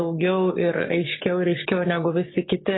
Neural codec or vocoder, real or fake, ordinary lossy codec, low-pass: codec, 16 kHz, 6 kbps, DAC; fake; AAC, 16 kbps; 7.2 kHz